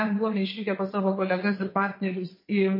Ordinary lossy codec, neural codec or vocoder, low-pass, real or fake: MP3, 24 kbps; vocoder, 22.05 kHz, 80 mel bands, WaveNeXt; 5.4 kHz; fake